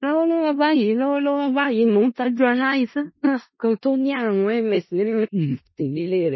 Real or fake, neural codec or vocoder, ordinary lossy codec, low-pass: fake; codec, 16 kHz in and 24 kHz out, 0.4 kbps, LongCat-Audio-Codec, four codebook decoder; MP3, 24 kbps; 7.2 kHz